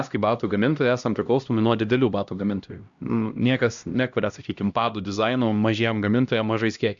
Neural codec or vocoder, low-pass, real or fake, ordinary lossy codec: codec, 16 kHz, 1 kbps, X-Codec, HuBERT features, trained on LibriSpeech; 7.2 kHz; fake; Opus, 64 kbps